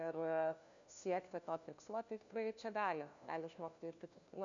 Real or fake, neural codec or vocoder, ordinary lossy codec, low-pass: fake; codec, 16 kHz, 1 kbps, FunCodec, trained on LibriTTS, 50 frames a second; MP3, 96 kbps; 7.2 kHz